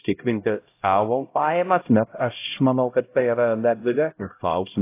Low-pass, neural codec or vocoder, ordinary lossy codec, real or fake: 3.6 kHz; codec, 16 kHz, 0.5 kbps, X-Codec, HuBERT features, trained on LibriSpeech; AAC, 24 kbps; fake